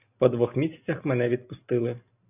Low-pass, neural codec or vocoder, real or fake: 3.6 kHz; none; real